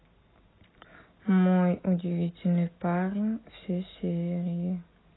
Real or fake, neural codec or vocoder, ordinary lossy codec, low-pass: real; none; AAC, 16 kbps; 7.2 kHz